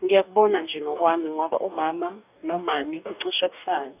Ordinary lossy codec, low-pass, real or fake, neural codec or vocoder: none; 3.6 kHz; fake; codec, 44.1 kHz, 2.6 kbps, DAC